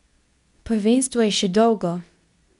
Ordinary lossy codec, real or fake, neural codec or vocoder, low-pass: none; fake; codec, 24 kHz, 0.9 kbps, WavTokenizer, medium speech release version 2; 10.8 kHz